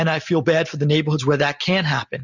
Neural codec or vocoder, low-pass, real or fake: none; 7.2 kHz; real